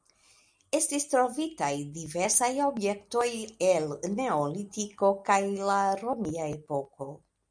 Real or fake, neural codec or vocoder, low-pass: real; none; 9.9 kHz